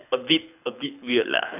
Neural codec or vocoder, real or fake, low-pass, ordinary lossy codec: codec, 24 kHz, 6 kbps, HILCodec; fake; 3.6 kHz; none